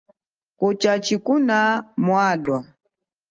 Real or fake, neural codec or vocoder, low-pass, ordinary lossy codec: real; none; 7.2 kHz; Opus, 24 kbps